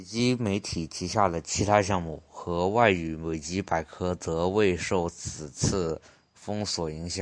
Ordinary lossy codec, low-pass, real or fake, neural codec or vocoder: MP3, 64 kbps; 9.9 kHz; real; none